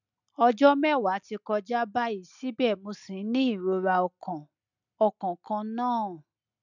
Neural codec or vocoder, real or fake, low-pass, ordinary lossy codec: none; real; 7.2 kHz; none